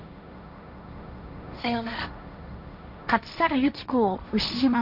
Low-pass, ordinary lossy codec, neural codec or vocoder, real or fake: 5.4 kHz; none; codec, 16 kHz, 1.1 kbps, Voila-Tokenizer; fake